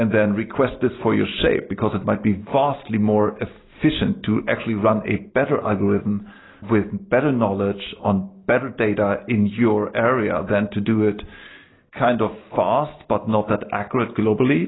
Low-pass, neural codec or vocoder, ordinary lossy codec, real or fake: 7.2 kHz; none; AAC, 16 kbps; real